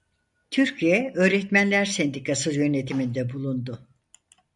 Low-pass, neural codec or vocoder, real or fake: 10.8 kHz; none; real